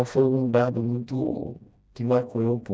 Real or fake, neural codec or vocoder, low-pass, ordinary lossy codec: fake; codec, 16 kHz, 1 kbps, FreqCodec, smaller model; none; none